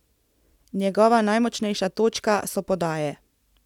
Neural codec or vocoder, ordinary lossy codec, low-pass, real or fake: none; none; 19.8 kHz; real